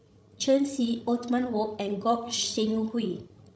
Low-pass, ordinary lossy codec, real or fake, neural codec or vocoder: none; none; fake; codec, 16 kHz, 16 kbps, FreqCodec, larger model